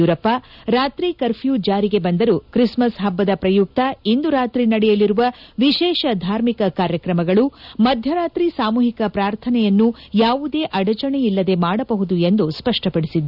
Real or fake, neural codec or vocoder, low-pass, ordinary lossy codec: real; none; 5.4 kHz; none